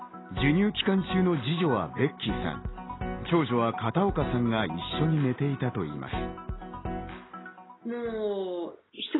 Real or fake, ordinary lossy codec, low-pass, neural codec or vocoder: real; AAC, 16 kbps; 7.2 kHz; none